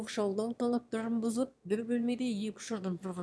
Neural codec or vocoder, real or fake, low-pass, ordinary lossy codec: autoencoder, 22.05 kHz, a latent of 192 numbers a frame, VITS, trained on one speaker; fake; none; none